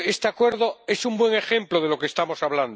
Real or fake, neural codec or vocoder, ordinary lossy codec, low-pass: real; none; none; none